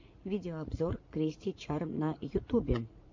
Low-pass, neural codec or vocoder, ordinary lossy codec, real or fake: 7.2 kHz; vocoder, 44.1 kHz, 80 mel bands, Vocos; MP3, 48 kbps; fake